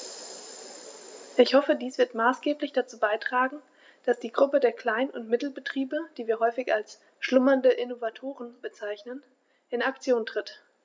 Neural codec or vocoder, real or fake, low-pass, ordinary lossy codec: none; real; none; none